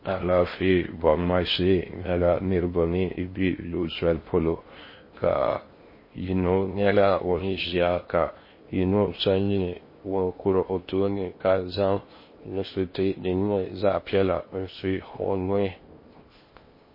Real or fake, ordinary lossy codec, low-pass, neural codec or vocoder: fake; MP3, 24 kbps; 5.4 kHz; codec, 16 kHz in and 24 kHz out, 0.6 kbps, FocalCodec, streaming, 4096 codes